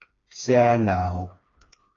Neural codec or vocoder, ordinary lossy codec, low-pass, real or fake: codec, 16 kHz, 2 kbps, FreqCodec, smaller model; AAC, 32 kbps; 7.2 kHz; fake